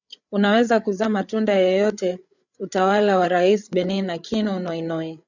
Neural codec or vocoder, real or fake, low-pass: codec, 16 kHz, 8 kbps, FreqCodec, larger model; fake; 7.2 kHz